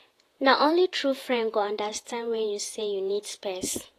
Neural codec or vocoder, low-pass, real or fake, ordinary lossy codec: vocoder, 48 kHz, 128 mel bands, Vocos; 14.4 kHz; fake; AAC, 48 kbps